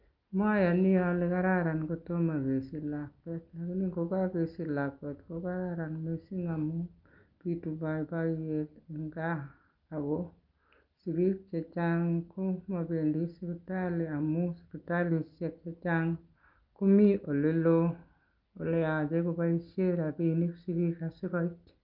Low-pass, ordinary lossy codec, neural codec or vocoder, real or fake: 5.4 kHz; Opus, 24 kbps; none; real